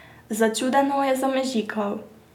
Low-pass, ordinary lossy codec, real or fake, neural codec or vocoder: 19.8 kHz; none; real; none